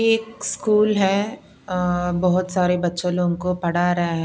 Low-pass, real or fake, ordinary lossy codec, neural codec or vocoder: none; real; none; none